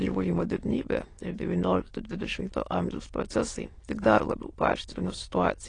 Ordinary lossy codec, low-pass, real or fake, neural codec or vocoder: AAC, 32 kbps; 9.9 kHz; fake; autoencoder, 22.05 kHz, a latent of 192 numbers a frame, VITS, trained on many speakers